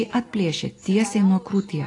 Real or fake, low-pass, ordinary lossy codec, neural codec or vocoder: real; 10.8 kHz; AAC, 32 kbps; none